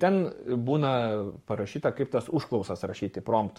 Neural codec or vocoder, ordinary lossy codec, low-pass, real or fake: codec, 44.1 kHz, 7.8 kbps, Pupu-Codec; MP3, 64 kbps; 19.8 kHz; fake